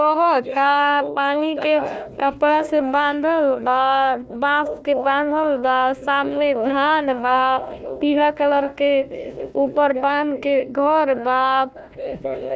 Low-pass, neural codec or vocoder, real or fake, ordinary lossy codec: none; codec, 16 kHz, 1 kbps, FunCodec, trained on Chinese and English, 50 frames a second; fake; none